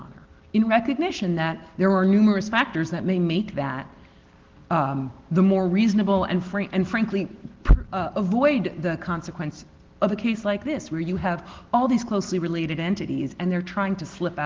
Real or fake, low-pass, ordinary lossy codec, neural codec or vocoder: real; 7.2 kHz; Opus, 16 kbps; none